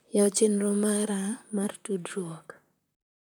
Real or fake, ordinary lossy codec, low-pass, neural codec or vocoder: fake; none; none; vocoder, 44.1 kHz, 128 mel bands, Pupu-Vocoder